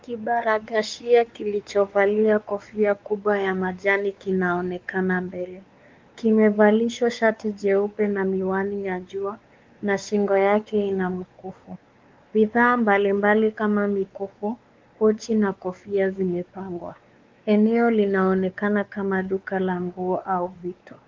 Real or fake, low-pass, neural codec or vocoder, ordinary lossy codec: fake; 7.2 kHz; codec, 16 kHz, 4 kbps, X-Codec, WavLM features, trained on Multilingual LibriSpeech; Opus, 32 kbps